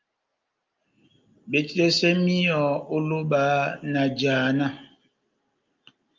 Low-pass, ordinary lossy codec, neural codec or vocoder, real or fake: 7.2 kHz; Opus, 24 kbps; none; real